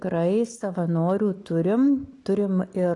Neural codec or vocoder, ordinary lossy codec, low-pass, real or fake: none; AAC, 48 kbps; 10.8 kHz; real